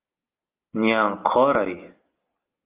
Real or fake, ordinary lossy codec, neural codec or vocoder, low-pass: real; Opus, 32 kbps; none; 3.6 kHz